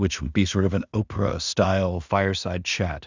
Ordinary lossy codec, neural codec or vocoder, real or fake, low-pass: Opus, 64 kbps; codec, 16 kHz in and 24 kHz out, 0.4 kbps, LongCat-Audio-Codec, two codebook decoder; fake; 7.2 kHz